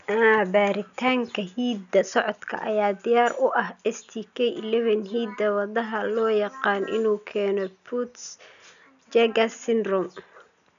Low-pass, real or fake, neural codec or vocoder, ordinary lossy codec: 7.2 kHz; real; none; none